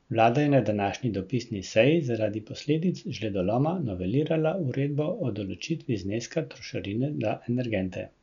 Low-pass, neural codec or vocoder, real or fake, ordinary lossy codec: 7.2 kHz; none; real; none